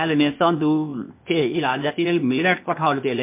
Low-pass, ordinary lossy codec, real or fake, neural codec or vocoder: 3.6 kHz; MP3, 32 kbps; fake; codec, 16 kHz, 0.8 kbps, ZipCodec